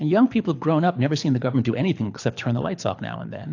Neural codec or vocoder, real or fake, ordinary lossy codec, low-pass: codec, 24 kHz, 6 kbps, HILCodec; fake; AAC, 48 kbps; 7.2 kHz